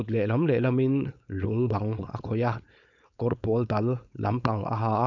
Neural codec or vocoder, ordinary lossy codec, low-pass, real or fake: codec, 16 kHz, 4.8 kbps, FACodec; none; 7.2 kHz; fake